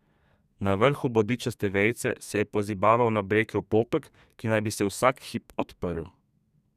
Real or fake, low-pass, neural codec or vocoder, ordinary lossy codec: fake; 14.4 kHz; codec, 32 kHz, 1.9 kbps, SNAC; none